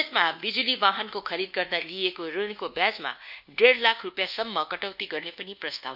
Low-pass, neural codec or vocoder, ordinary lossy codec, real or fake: 5.4 kHz; codec, 24 kHz, 1.2 kbps, DualCodec; none; fake